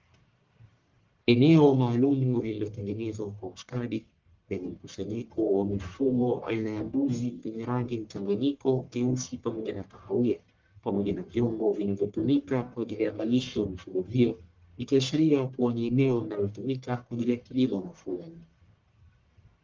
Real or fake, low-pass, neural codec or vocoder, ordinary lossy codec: fake; 7.2 kHz; codec, 44.1 kHz, 1.7 kbps, Pupu-Codec; Opus, 32 kbps